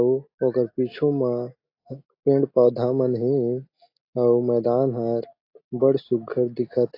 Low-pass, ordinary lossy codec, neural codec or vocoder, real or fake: 5.4 kHz; AAC, 32 kbps; none; real